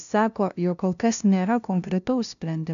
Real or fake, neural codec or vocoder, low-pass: fake; codec, 16 kHz, 0.5 kbps, FunCodec, trained on LibriTTS, 25 frames a second; 7.2 kHz